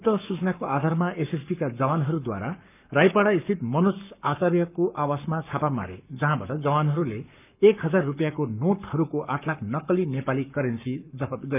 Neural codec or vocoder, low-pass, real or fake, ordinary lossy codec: codec, 44.1 kHz, 7.8 kbps, Pupu-Codec; 3.6 kHz; fake; none